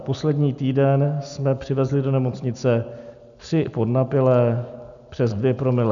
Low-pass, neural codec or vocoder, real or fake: 7.2 kHz; none; real